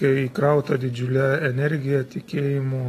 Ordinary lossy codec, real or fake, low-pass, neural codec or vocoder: AAC, 48 kbps; real; 14.4 kHz; none